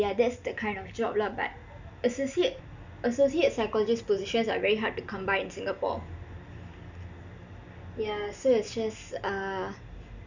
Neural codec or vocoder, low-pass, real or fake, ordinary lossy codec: none; 7.2 kHz; real; none